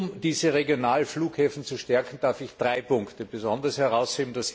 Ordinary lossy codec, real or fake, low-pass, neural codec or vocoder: none; real; none; none